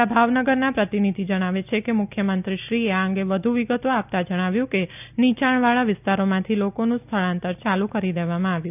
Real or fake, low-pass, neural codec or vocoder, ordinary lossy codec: real; 3.6 kHz; none; none